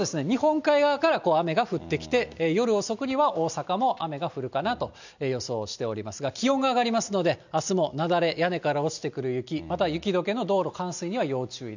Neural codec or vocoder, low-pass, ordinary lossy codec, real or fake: none; 7.2 kHz; none; real